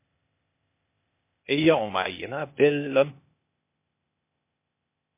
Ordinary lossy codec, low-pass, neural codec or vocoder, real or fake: MP3, 24 kbps; 3.6 kHz; codec, 16 kHz, 0.8 kbps, ZipCodec; fake